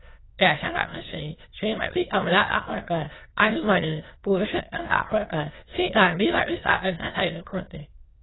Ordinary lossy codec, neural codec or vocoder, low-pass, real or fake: AAC, 16 kbps; autoencoder, 22.05 kHz, a latent of 192 numbers a frame, VITS, trained on many speakers; 7.2 kHz; fake